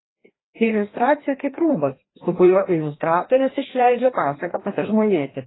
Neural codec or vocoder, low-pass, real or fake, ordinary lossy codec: codec, 16 kHz, 1 kbps, FreqCodec, larger model; 7.2 kHz; fake; AAC, 16 kbps